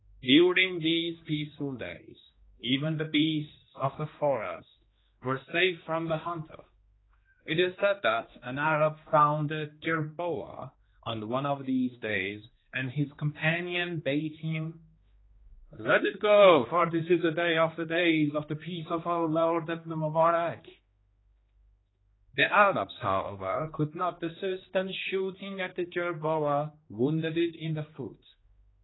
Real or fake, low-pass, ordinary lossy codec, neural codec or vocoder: fake; 7.2 kHz; AAC, 16 kbps; codec, 16 kHz, 2 kbps, X-Codec, HuBERT features, trained on general audio